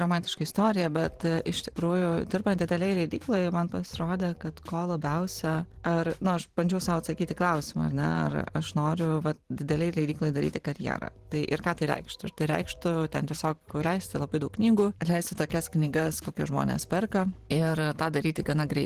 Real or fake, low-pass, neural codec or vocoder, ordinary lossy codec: fake; 14.4 kHz; vocoder, 44.1 kHz, 128 mel bands every 512 samples, BigVGAN v2; Opus, 16 kbps